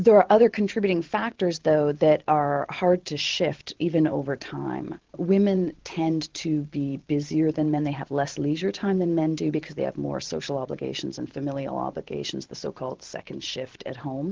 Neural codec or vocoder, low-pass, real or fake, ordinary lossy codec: vocoder, 44.1 kHz, 128 mel bands every 512 samples, BigVGAN v2; 7.2 kHz; fake; Opus, 16 kbps